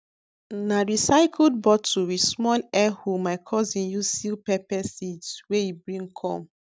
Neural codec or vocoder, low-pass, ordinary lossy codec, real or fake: none; none; none; real